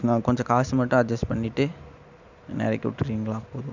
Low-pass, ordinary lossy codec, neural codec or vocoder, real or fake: 7.2 kHz; none; none; real